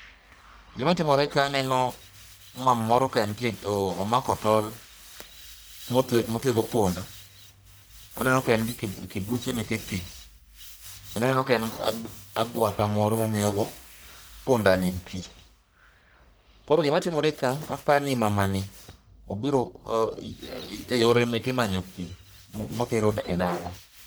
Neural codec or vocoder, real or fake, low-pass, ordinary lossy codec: codec, 44.1 kHz, 1.7 kbps, Pupu-Codec; fake; none; none